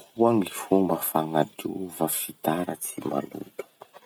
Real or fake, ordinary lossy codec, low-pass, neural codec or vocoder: real; none; none; none